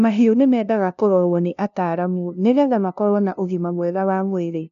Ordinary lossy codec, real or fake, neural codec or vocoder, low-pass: none; fake; codec, 16 kHz, 0.5 kbps, FunCodec, trained on LibriTTS, 25 frames a second; 7.2 kHz